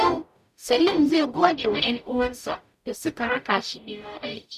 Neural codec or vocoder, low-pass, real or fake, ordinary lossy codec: codec, 44.1 kHz, 0.9 kbps, DAC; 14.4 kHz; fake; none